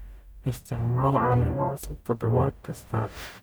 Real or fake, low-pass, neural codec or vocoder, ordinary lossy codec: fake; none; codec, 44.1 kHz, 0.9 kbps, DAC; none